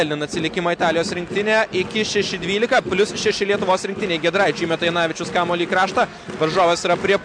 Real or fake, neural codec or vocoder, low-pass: real; none; 9.9 kHz